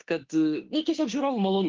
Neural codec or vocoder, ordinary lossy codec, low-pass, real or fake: autoencoder, 48 kHz, 32 numbers a frame, DAC-VAE, trained on Japanese speech; Opus, 16 kbps; 7.2 kHz; fake